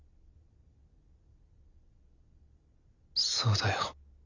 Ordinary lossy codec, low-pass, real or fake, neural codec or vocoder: none; 7.2 kHz; real; none